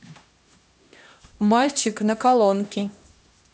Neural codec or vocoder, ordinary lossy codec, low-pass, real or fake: codec, 16 kHz, 1 kbps, X-Codec, HuBERT features, trained on LibriSpeech; none; none; fake